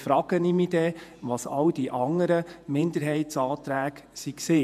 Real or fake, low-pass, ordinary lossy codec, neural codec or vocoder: fake; 14.4 kHz; MP3, 96 kbps; vocoder, 48 kHz, 128 mel bands, Vocos